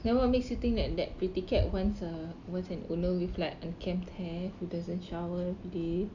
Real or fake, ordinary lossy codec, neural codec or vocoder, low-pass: real; none; none; 7.2 kHz